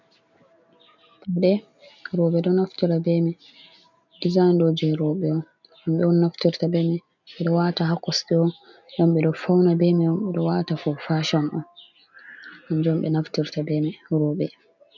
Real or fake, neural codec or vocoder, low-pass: real; none; 7.2 kHz